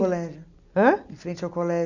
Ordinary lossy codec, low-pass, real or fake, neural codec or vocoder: none; 7.2 kHz; real; none